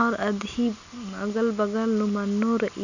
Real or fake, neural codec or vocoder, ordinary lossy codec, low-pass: real; none; none; 7.2 kHz